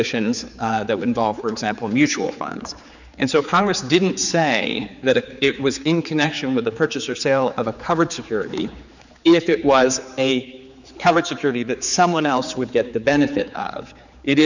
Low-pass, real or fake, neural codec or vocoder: 7.2 kHz; fake; codec, 16 kHz, 4 kbps, X-Codec, HuBERT features, trained on general audio